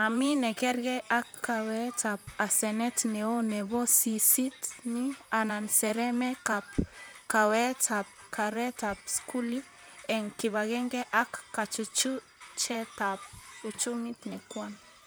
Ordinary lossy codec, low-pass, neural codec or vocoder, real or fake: none; none; vocoder, 44.1 kHz, 128 mel bands, Pupu-Vocoder; fake